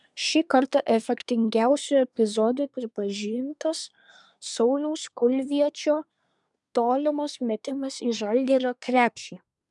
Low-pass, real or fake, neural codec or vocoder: 10.8 kHz; fake; codec, 24 kHz, 1 kbps, SNAC